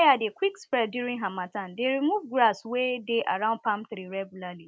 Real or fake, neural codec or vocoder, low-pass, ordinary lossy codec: real; none; none; none